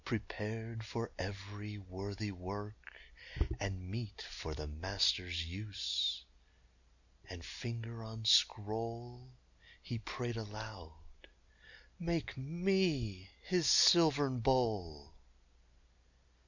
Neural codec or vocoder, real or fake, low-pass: none; real; 7.2 kHz